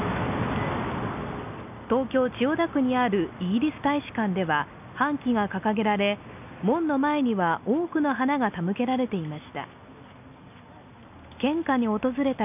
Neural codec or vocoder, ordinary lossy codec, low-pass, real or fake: none; none; 3.6 kHz; real